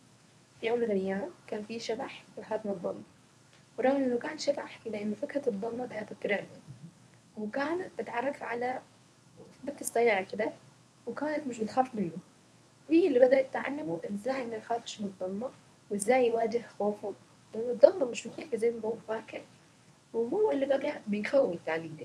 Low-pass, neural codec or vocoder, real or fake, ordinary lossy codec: none; codec, 24 kHz, 0.9 kbps, WavTokenizer, medium speech release version 1; fake; none